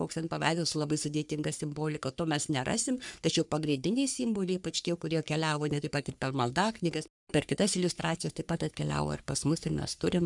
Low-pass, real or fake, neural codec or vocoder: 10.8 kHz; fake; codec, 44.1 kHz, 3.4 kbps, Pupu-Codec